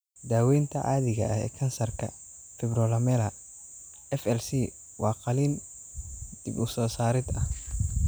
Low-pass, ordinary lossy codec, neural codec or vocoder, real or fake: none; none; none; real